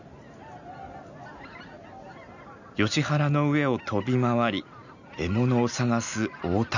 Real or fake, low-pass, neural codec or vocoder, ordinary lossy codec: real; 7.2 kHz; none; none